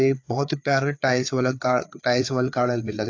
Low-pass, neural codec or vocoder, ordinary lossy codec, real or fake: 7.2 kHz; codec, 16 kHz, 4 kbps, FreqCodec, larger model; AAC, 48 kbps; fake